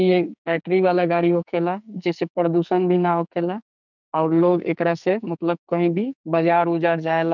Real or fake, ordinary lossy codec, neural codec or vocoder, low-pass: fake; none; codec, 44.1 kHz, 2.6 kbps, SNAC; 7.2 kHz